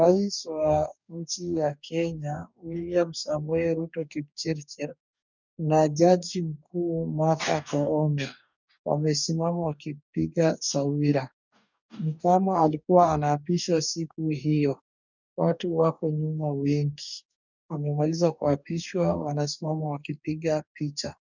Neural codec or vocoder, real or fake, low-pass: codec, 44.1 kHz, 2.6 kbps, DAC; fake; 7.2 kHz